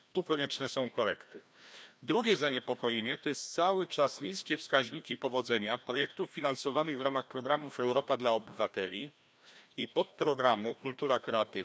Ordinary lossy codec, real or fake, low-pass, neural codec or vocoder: none; fake; none; codec, 16 kHz, 1 kbps, FreqCodec, larger model